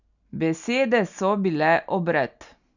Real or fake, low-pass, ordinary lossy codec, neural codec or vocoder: real; 7.2 kHz; none; none